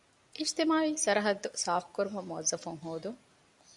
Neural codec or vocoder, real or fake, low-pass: none; real; 10.8 kHz